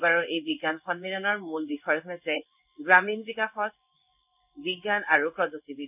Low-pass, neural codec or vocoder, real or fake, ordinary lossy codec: 3.6 kHz; codec, 16 kHz in and 24 kHz out, 1 kbps, XY-Tokenizer; fake; none